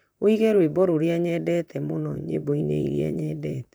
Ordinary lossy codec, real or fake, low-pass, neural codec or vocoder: none; fake; none; vocoder, 44.1 kHz, 128 mel bands, Pupu-Vocoder